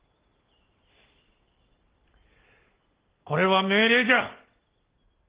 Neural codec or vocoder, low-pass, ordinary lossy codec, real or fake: none; 3.6 kHz; Opus, 16 kbps; real